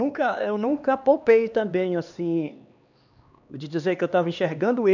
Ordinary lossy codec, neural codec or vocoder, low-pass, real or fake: none; codec, 16 kHz, 2 kbps, X-Codec, HuBERT features, trained on LibriSpeech; 7.2 kHz; fake